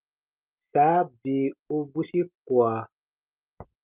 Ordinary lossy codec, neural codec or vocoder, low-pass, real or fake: Opus, 32 kbps; none; 3.6 kHz; real